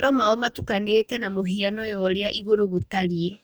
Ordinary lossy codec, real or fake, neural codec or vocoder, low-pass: none; fake; codec, 44.1 kHz, 2.6 kbps, DAC; none